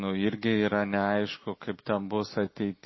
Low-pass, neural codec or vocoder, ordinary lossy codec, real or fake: 7.2 kHz; none; MP3, 24 kbps; real